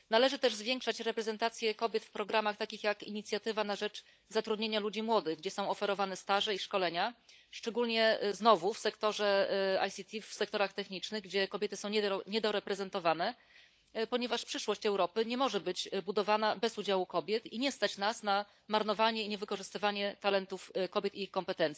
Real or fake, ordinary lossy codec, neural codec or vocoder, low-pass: fake; none; codec, 16 kHz, 16 kbps, FunCodec, trained on LibriTTS, 50 frames a second; none